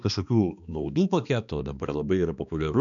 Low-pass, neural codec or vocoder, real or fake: 7.2 kHz; codec, 16 kHz, 2 kbps, X-Codec, HuBERT features, trained on balanced general audio; fake